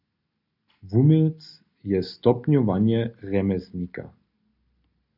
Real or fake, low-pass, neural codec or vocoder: real; 5.4 kHz; none